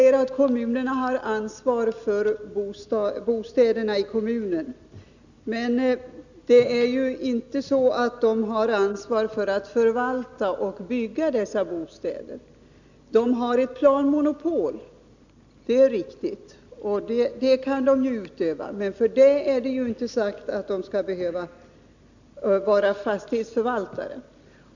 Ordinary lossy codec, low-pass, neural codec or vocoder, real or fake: none; 7.2 kHz; none; real